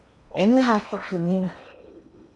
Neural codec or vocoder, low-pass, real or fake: codec, 16 kHz in and 24 kHz out, 0.8 kbps, FocalCodec, streaming, 65536 codes; 10.8 kHz; fake